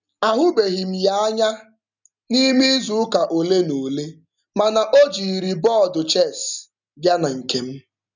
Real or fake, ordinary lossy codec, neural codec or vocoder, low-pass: real; none; none; 7.2 kHz